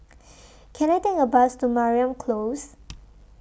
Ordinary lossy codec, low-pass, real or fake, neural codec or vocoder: none; none; real; none